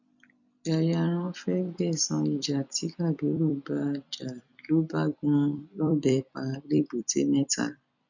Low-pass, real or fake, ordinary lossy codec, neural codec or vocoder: 7.2 kHz; fake; none; vocoder, 44.1 kHz, 128 mel bands every 256 samples, BigVGAN v2